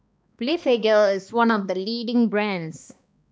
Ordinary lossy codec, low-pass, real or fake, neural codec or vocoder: none; none; fake; codec, 16 kHz, 2 kbps, X-Codec, HuBERT features, trained on balanced general audio